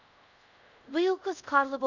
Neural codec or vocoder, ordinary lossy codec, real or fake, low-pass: codec, 24 kHz, 0.5 kbps, DualCodec; none; fake; 7.2 kHz